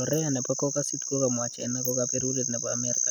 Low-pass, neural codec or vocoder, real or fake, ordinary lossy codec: none; none; real; none